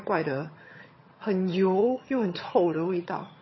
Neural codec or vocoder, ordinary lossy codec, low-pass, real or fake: vocoder, 22.05 kHz, 80 mel bands, HiFi-GAN; MP3, 24 kbps; 7.2 kHz; fake